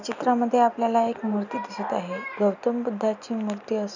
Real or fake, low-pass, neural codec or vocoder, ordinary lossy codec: real; 7.2 kHz; none; none